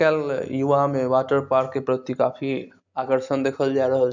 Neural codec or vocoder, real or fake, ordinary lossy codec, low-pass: none; real; none; 7.2 kHz